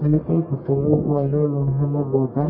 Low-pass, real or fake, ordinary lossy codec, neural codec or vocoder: 5.4 kHz; fake; MP3, 24 kbps; codec, 44.1 kHz, 1.7 kbps, Pupu-Codec